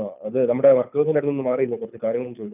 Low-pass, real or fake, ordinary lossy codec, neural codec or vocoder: 3.6 kHz; fake; none; codec, 24 kHz, 6 kbps, HILCodec